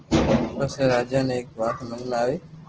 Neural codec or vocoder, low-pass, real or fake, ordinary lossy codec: none; 7.2 kHz; real; Opus, 16 kbps